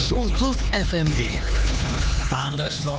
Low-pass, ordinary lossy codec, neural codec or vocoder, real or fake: none; none; codec, 16 kHz, 2 kbps, X-Codec, HuBERT features, trained on LibriSpeech; fake